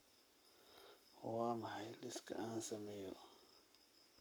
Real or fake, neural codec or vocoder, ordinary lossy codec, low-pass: fake; codec, 44.1 kHz, 7.8 kbps, Pupu-Codec; none; none